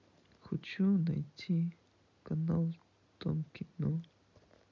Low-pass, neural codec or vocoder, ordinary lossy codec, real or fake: 7.2 kHz; none; none; real